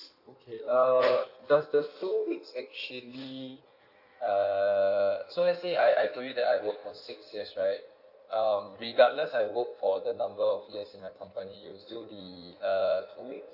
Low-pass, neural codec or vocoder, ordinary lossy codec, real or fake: 5.4 kHz; codec, 16 kHz in and 24 kHz out, 1.1 kbps, FireRedTTS-2 codec; none; fake